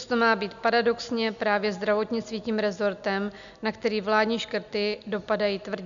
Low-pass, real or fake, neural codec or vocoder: 7.2 kHz; real; none